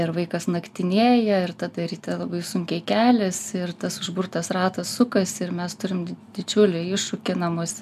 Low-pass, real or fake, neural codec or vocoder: 14.4 kHz; real; none